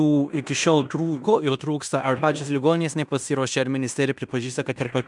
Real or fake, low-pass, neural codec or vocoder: fake; 10.8 kHz; codec, 16 kHz in and 24 kHz out, 0.9 kbps, LongCat-Audio-Codec, fine tuned four codebook decoder